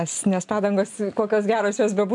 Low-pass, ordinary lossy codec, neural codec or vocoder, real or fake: 10.8 kHz; MP3, 96 kbps; none; real